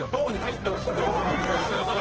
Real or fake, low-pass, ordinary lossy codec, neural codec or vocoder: fake; 7.2 kHz; Opus, 16 kbps; codec, 24 kHz, 0.9 kbps, WavTokenizer, medium music audio release